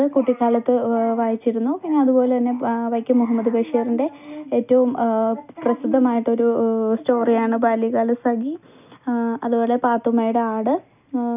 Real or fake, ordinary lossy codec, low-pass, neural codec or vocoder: real; none; 3.6 kHz; none